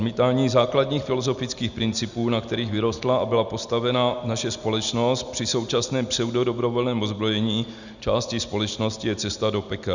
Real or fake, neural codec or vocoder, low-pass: real; none; 7.2 kHz